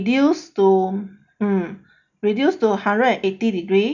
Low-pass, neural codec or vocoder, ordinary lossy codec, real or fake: 7.2 kHz; none; none; real